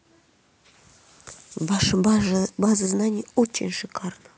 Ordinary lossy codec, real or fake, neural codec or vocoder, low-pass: none; real; none; none